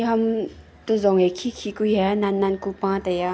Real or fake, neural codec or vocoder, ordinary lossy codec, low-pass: real; none; none; none